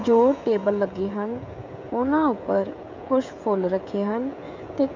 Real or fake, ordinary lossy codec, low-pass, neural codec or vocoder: fake; MP3, 64 kbps; 7.2 kHz; codec, 16 kHz, 16 kbps, FreqCodec, smaller model